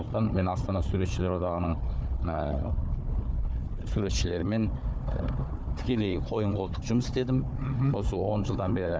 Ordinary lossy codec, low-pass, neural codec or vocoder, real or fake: none; none; codec, 16 kHz, 4 kbps, FunCodec, trained on Chinese and English, 50 frames a second; fake